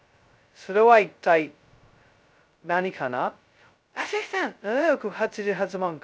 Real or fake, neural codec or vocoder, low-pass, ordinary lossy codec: fake; codec, 16 kHz, 0.2 kbps, FocalCodec; none; none